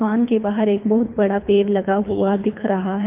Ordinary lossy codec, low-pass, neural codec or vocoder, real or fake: Opus, 32 kbps; 3.6 kHz; codec, 24 kHz, 3 kbps, HILCodec; fake